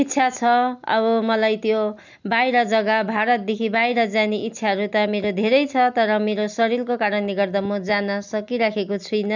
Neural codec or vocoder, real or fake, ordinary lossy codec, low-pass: none; real; none; 7.2 kHz